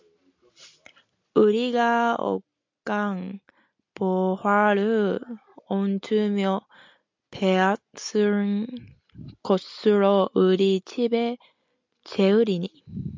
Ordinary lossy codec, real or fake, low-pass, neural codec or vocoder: MP3, 64 kbps; real; 7.2 kHz; none